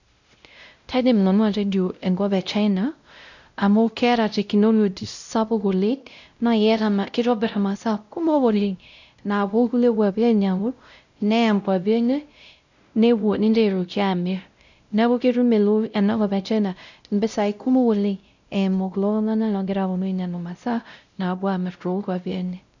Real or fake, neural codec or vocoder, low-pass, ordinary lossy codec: fake; codec, 16 kHz, 0.5 kbps, X-Codec, WavLM features, trained on Multilingual LibriSpeech; 7.2 kHz; none